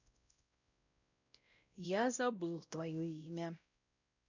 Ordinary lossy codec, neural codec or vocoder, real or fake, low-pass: none; codec, 16 kHz, 0.5 kbps, X-Codec, WavLM features, trained on Multilingual LibriSpeech; fake; 7.2 kHz